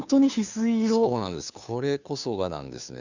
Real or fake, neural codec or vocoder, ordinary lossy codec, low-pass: fake; codec, 16 kHz, 2 kbps, FunCodec, trained on Chinese and English, 25 frames a second; none; 7.2 kHz